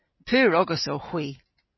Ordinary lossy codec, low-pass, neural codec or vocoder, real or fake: MP3, 24 kbps; 7.2 kHz; none; real